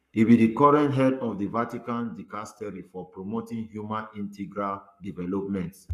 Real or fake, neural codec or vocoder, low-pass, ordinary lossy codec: fake; codec, 44.1 kHz, 7.8 kbps, Pupu-Codec; 14.4 kHz; none